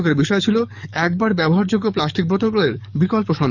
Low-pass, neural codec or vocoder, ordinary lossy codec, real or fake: 7.2 kHz; codec, 44.1 kHz, 7.8 kbps, DAC; none; fake